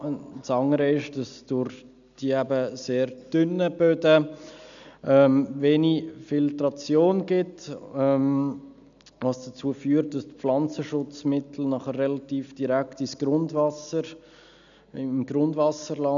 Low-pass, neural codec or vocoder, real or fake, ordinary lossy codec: 7.2 kHz; none; real; none